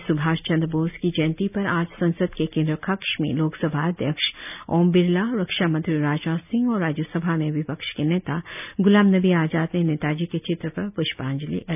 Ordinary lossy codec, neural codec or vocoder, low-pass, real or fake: none; none; 3.6 kHz; real